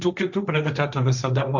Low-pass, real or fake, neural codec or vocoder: 7.2 kHz; fake; codec, 16 kHz, 1.1 kbps, Voila-Tokenizer